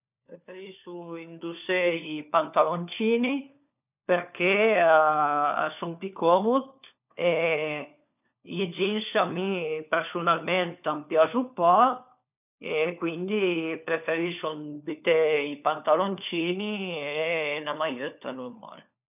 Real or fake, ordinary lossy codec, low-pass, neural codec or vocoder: fake; none; 3.6 kHz; codec, 16 kHz, 4 kbps, FunCodec, trained on LibriTTS, 50 frames a second